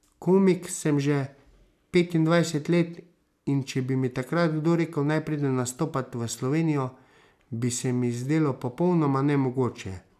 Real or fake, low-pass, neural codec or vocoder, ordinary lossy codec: real; 14.4 kHz; none; none